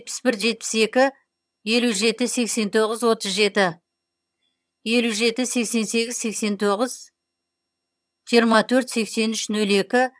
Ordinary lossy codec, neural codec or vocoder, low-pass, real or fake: none; vocoder, 22.05 kHz, 80 mel bands, HiFi-GAN; none; fake